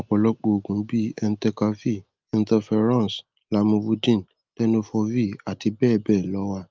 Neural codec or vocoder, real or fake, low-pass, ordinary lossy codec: none; real; 7.2 kHz; Opus, 24 kbps